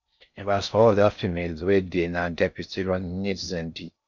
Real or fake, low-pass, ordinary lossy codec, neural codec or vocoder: fake; 7.2 kHz; none; codec, 16 kHz in and 24 kHz out, 0.6 kbps, FocalCodec, streaming, 4096 codes